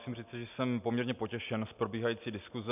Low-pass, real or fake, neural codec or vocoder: 3.6 kHz; real; none